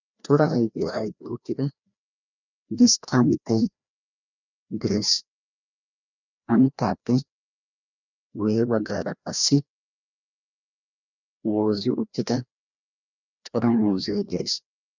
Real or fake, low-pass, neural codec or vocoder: fake; 7.2 kHz; codec, 16 kHz, 1 kbps, FreqCodec, larger model